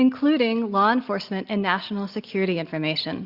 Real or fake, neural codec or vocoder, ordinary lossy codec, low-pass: real; none; Opus, 64 kbps; 5.4 kHz